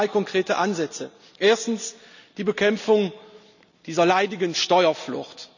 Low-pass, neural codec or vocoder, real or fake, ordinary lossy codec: 7.2 kHz; none; real; none